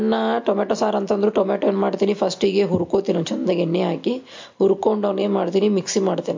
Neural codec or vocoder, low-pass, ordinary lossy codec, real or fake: none; 7.2 kHz; MP3, 48 kbps; real